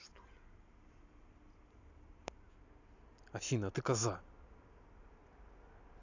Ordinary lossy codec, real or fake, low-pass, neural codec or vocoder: AAC, 48 kbps; real; 7.2 kHz; none